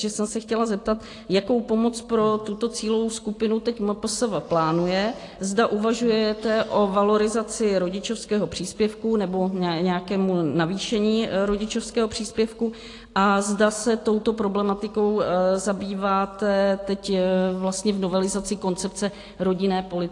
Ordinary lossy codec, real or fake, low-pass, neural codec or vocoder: AAC, 48 kbps; real; 10.8 kHz; none